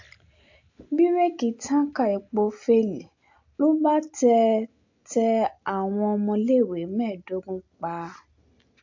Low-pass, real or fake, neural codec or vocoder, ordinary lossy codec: 7.2 kHz; real; none; AAC, 48 kbps